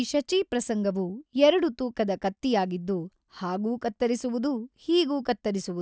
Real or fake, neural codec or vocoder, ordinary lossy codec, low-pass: real; none; none; none